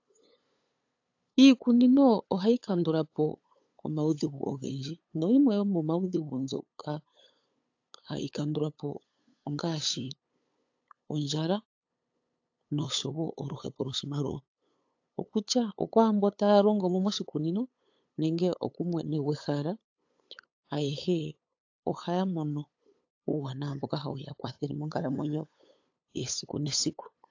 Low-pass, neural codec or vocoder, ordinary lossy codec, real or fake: 7.2 kHz; codec, 16 kHz, 8 kbps, FunCodec, trained on LibriTTS, 25 frames a second; AAC, 48 kbps; fake